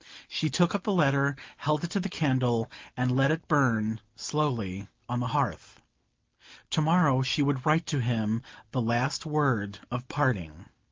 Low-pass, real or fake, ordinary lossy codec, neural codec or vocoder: 7.2 kHz; real; Opus, 32 kbps; none